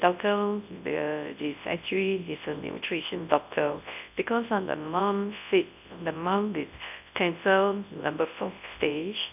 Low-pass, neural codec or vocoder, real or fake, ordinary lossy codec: 3.6 kHz; codec, 24 kHz, 0.9 kbps, WavTokenizer, large speech release; fake; none